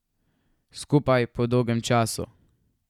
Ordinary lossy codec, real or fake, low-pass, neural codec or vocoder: none; real; 19.8 kHz; none